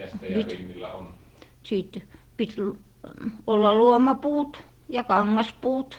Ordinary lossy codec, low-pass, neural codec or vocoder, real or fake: Opus, 16 kbps; 19.8 kHz; vocoder, 48 kHz, 128 mel bands, Vocos; fake